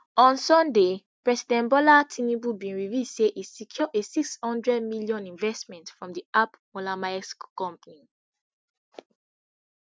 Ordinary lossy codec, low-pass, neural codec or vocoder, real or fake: none; none; none; real